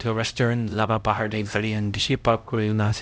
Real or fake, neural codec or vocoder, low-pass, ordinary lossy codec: fake; codec, 16 kHz, 0.5 kbps, X-Codec, HuBERT features, trained on LibriSpeech; none; none